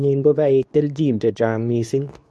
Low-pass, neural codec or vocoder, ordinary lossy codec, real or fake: none; codec, 24 kHz, 0.9 kbps, WavTokenizer, medium speech release version 2; none; fake